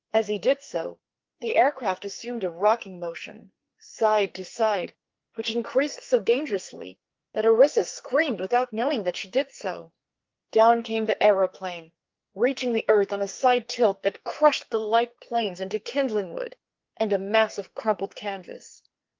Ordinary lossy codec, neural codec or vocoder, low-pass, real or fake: Opus, 32 kbps; codec, 44.1 kHz, 2.6 kbps, SNAC; 7.2 kHz; fake